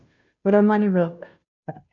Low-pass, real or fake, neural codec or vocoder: 7.2 kHz; fake; codec, 16 kHz, 0.5 kbps, FunCodec, trained on Chinese and English, 25 frames a second